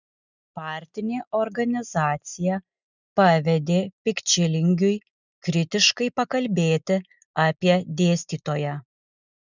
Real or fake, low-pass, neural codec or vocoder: real; 7.2 kHz; none